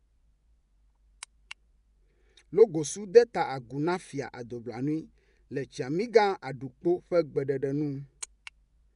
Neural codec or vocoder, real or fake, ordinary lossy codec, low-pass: vocoder, 24 kHz, 100 mel bands, Vocos; fake; none; 10.8 kHz